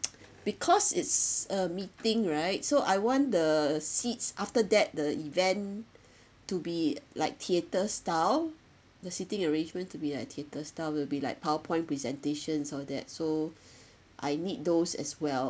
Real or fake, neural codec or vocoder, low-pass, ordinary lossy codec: real; none; none; none